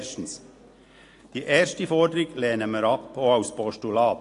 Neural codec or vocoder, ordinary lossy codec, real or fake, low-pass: none; AAC, 48 kbps; real; 10.8 kHz